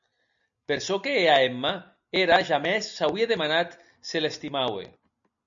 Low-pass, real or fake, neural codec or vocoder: 7.2 kHz; real; none